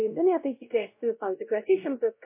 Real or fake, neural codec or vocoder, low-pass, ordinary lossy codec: fake; codec, 16 kHz, 0.5 kbps, X-Codec, WavLM features, trained on Multilingual LibriSpeech; 3.6 kHz; MP3, 24 kbps